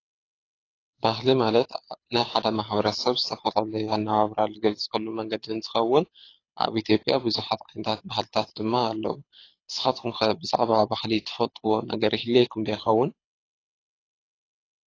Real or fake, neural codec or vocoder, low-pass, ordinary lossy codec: fake; codec, 24 kHz, 6 kbps, HILCodec; 7.2 kHz; AAC, 32 kbps